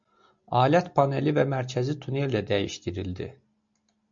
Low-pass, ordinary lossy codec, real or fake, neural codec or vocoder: 7.2 kHz; MP3, 48 kbps; real; none